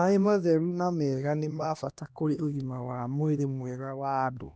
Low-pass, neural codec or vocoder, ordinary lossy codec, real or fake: none; codec, 16 kHz, 1 kbps, X-Codec, HuBERT features, trained on LibriSpeech; none; fake